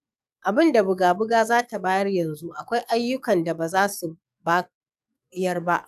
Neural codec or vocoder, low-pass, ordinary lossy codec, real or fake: codec, 44.1 kHz, 7.8 kbps, DAC; 14.4 kHz; none; fake